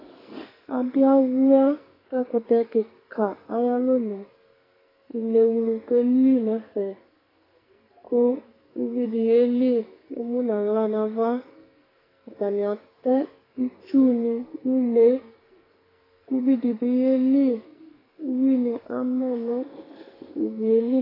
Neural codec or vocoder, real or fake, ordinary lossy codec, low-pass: codec, 44.1 kHz, 2.6 kbps, SNAC; fake; AAC, 24 kbps; 5.4 kHz